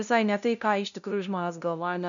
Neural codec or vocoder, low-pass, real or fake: codec, 16 kHz, 0.5 kbps, FunCodec, trained on LibriTTS, 25 frames a second; 7.2 kHz; fake